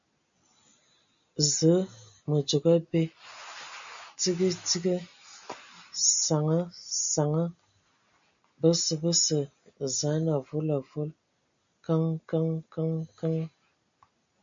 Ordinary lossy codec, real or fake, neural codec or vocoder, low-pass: MP3, 64 kbps; real; none; 7.2 kHz